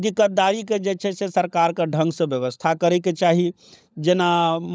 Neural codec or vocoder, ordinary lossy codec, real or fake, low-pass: codec, 16 kHz, 16 kbps, FunCodec, trained on LibriTTS, 50 frames a second; none; fake; none